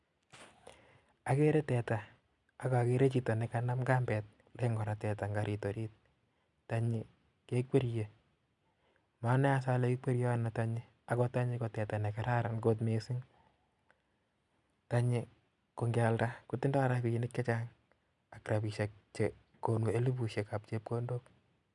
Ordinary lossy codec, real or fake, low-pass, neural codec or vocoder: none; real; 10.8 kHz; none